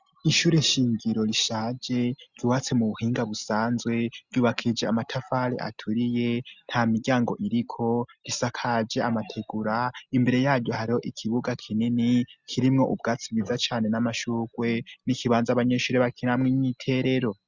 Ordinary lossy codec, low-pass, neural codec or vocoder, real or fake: Opus, 64 kbps; 7.2 kHz; none; real